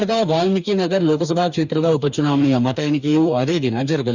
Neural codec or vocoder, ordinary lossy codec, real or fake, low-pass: codec, 44.1 kHz, 2.6 kbps, DAC; none; fake; 7.2 kHz